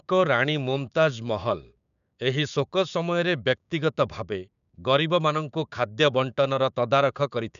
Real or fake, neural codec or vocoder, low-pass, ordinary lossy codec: fake; codec, 16 kHz, 6 kbps, DAC; 7.2 kHz; none